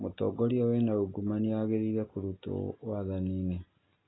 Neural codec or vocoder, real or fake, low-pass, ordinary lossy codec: none; real; 7.2 kHz; AAC, 16 kbps